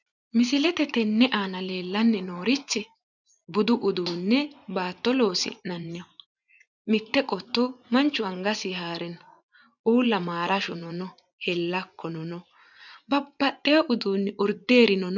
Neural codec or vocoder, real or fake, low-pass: vocoder, 24 kHz, 100 mel bands, Vocos; fake; 7.2 kHz